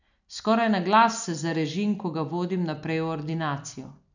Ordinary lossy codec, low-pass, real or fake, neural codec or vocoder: none; 7.2 kHz; real; none